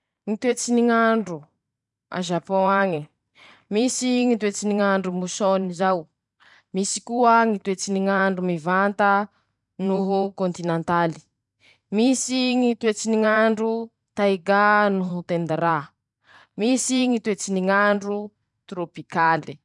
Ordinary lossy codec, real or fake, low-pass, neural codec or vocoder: none; fake; 10.8 kHz; vocoder, 44.1 kHz, 128 mel bands every 512 samples, BigVGAN v2